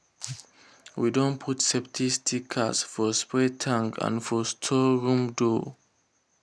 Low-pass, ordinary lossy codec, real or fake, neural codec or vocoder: none; none; real; none